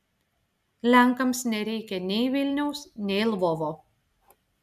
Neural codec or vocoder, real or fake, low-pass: none; real; 14.4 kHz